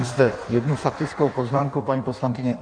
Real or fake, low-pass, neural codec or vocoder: fake; 9.9 kHz; codec, 16 kHz in and 24 kHz out, 1.1 kbps, FireRedTTS-2 codec